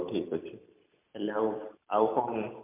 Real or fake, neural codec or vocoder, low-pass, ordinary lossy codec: fake; vocoder, 44.1 kHz, 128 mel bands every 256 samples, BigVGAN v2; 3.6 kHz; MP3, 32 kbps